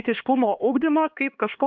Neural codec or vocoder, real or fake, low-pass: codec, 16 kHz, 2 kbps, X-Codec, HuBERT features, trained on LibriSpeech; fake; 7.2 kHz